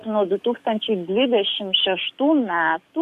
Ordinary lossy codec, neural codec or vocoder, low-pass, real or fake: MP3, 64 kbps; codec, 44.1 kHz, 7.8 kbps, DAC; 14.4 kHz; fake